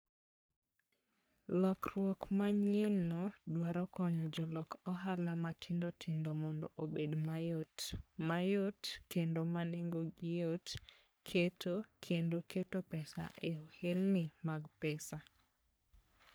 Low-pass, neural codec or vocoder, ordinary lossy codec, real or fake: none; codec, 44.1 kHz, 3.4 kbps, Pupu-Codec; none; fake